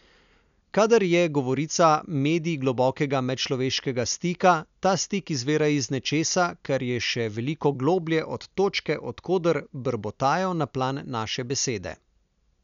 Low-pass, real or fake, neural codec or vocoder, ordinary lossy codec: 7.2 kHz; real; none; none